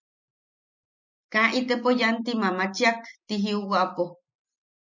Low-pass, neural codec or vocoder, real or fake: 7.2 kHz; none; real